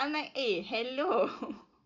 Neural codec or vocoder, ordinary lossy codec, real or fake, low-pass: none; none; real; 7.2 kHz